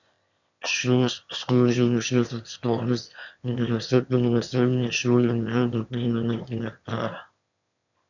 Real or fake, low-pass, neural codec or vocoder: fake; 7.2 kHz; autoencoder, 22.05 kHz, a latent of 192 numbers a frame, VITS, trained on one speaker